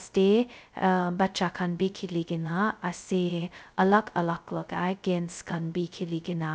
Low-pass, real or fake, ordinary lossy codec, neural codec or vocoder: none; fake; none; codec, 16 kHz, 0.2 kbps, FocalCodec